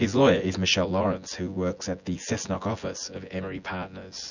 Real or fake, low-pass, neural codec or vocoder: fake; 7.2 kHz; vocoder, 24 kHz, 100 mel bands, Vocos